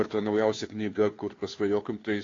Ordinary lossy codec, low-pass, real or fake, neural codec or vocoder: AAC, 32 kbps; 7.2 kHz; fake; codec, 16 kHz, 2 kbps, FunCodec, trained on Chinese and English, 25 frames a second